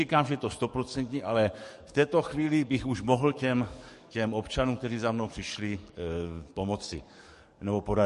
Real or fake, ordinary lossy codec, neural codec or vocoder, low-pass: fake; MP3, 48 kbps; codec, 44.1 kHz, 7.8 kbps, DAC; 14.4 kHz